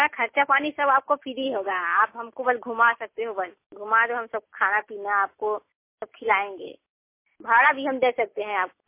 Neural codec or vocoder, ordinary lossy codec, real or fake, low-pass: none; MP3, 24 kbps; real; 3.6 kHz